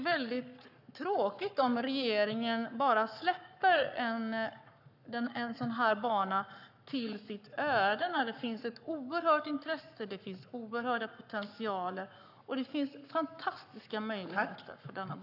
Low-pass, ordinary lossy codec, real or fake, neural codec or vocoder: 5.4 kHz; none; fake; codec, 44.1 kHz, 7.8 kbps, Pupu-Codec